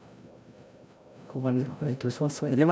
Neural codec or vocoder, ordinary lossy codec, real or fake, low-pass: codec, 16 kHz, 0.5 kbps, FreqCodec, larger model; none; fake; none